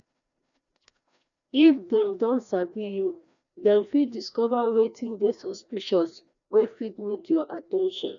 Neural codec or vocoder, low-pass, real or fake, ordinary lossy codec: codec, 16 kHz, 1 kbps, FreqCodec, larger model; 7.2 kHz; fake; none